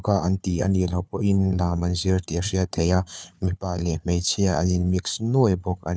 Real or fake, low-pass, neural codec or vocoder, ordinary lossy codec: fake; none; codec, 16 kHz, 4 kbps, FunCodec, trained on Chinese and English, 50 frames a second; none